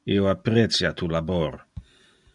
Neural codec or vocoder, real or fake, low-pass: none; real; 10.8 kHz